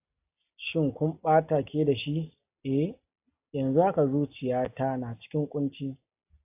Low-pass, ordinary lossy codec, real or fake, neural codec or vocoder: 3.6 kHz; AAC, 32 kbps; real; none